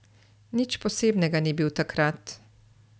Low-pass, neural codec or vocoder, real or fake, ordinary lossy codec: none; none; real; none